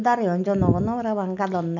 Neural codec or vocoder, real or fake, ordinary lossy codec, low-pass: none; real; none; 7.2 kHz